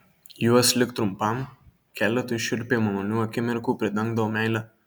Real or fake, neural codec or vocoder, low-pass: real; none; 19.8 kHz